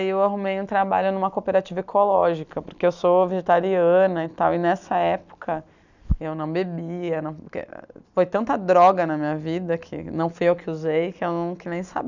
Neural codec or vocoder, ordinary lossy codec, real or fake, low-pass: none; none; real; 7.2 kHz